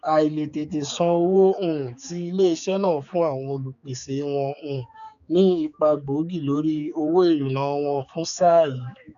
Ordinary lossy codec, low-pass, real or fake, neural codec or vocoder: none; 7.2 kHz; fake; codec, 16 kHz, 4 kbps, X-Codec, HuBERT features, trained on general audio